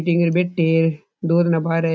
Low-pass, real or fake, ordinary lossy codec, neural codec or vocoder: none; real; none; none